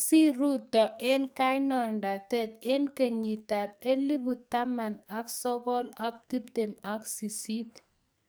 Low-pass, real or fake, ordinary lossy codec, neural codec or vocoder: none; fake; none; codec, 44.1 kHz, 2.6 kbps, SNAC